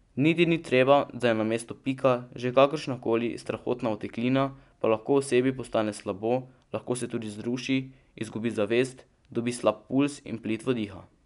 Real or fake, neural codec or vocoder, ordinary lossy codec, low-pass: real; none; none; 10.8 kHz